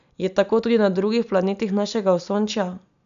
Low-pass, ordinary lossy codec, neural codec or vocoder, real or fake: 7.2 kHz; none; none; real